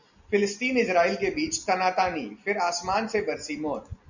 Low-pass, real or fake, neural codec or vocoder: 7.2 kHz; real; none